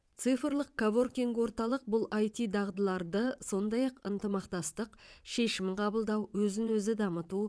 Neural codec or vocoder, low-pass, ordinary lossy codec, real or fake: vocoder, 22.05 kHz, 80 mel bands, WaveNeXt; none; none; fake